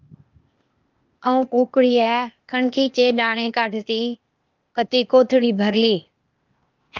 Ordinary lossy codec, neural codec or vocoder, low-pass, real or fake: Opus, 24 kbps; codec, 16 kHz, 0.8 kbps, ZipCodec; 7.2 kHz; fake